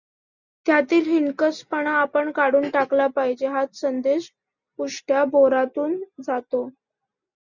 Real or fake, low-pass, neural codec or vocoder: real; 7.2 kHz; none